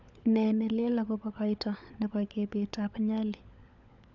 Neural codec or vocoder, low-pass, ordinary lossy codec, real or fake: codec, 16 kHz, 16 kbps, FunCodec, trained on LibriTTS, 50 frames a second; 7.2 kHz; none; fake